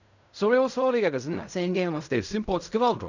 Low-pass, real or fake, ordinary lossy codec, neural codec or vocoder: 7.2 kHz; fake; none; codec, 16 kHz in and 24 kHz out, 0.4 kbps, LongCat-Audio-Codec, fine tuned four codebook decoder